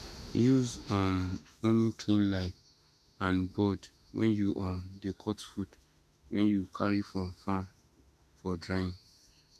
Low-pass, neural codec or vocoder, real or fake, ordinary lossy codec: 14.4 kHz; autoencoder, 48 kHz, 32 numbers a frame, DAC-VAE, trained on Japanese speech; fake; none